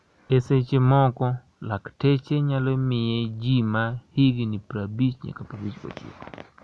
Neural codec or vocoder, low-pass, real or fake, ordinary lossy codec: none; none; real; none